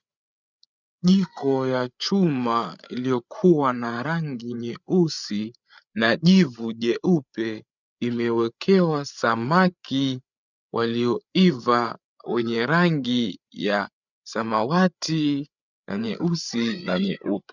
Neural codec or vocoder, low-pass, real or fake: codec, 16 kHz, 8 kbps, FreqCodec, larger model; 7.2 kHz; fake